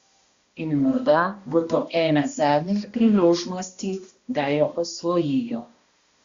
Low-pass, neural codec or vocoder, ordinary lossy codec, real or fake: 7.2 kHz; codec, 16 kHz, 1 kbps, X-Codec, HuBERT features, trained on balanced general audio; Opus, 64 kbps; fake